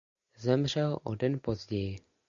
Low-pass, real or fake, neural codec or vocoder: 7.2 kHz; real; none